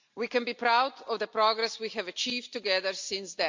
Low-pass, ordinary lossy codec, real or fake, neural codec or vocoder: 7.2 kHz; MP3, 64 kbps; real; none